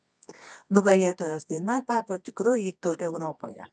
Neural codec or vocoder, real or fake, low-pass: codec, 24 kHz, 0.9 kbps, WavTokenizer, medium music audio release; fake; 10.8 kHz